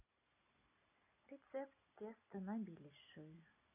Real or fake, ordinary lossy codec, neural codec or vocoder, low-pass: real; none; none; 3.6 kHz